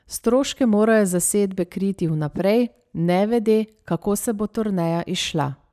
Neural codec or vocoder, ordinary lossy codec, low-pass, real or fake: none; none; 14.4 kHz; real